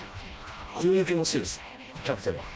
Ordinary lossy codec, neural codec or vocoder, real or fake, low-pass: none; codec, 16 kHz, 1 kbps, FreqCodec, smaller model; fake; none